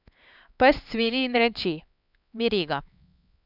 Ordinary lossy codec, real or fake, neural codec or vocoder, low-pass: none; fake; codec, 16 kHz, 4 kbps, X-Codec, HuBERT features, trained on LibriSpeech; 5.4 kHz